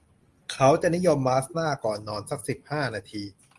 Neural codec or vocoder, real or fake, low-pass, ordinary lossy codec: none; real; 10.8 kHz; Opus, 24 kbps